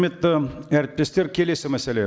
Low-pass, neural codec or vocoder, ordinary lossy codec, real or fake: none; none; none; real